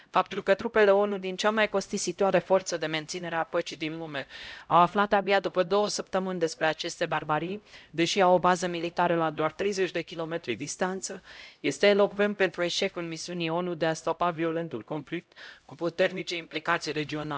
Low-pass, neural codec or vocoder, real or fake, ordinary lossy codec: none; codec, 16 kHz, 0.5 kbps, X-Codec, HuBERT features, trained on LibriSpeech; fake; none